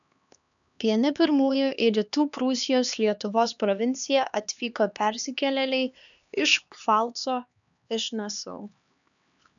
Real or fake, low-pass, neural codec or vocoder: fake; 7.2 kHz; codec, 16 kHz, 2 kbps, X-Codec, HuBERT features, trained on LibriSpeech